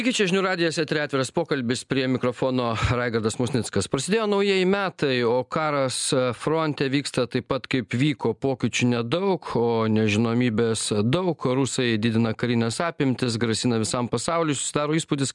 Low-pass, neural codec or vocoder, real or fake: 10.8 kHz; none; real